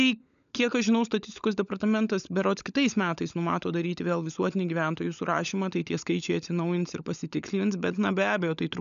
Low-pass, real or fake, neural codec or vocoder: 7.2 kHz; fake; codec, 16 kHz, 16 kbps, FunCodec, trained on LibriTTS, 50 frames a second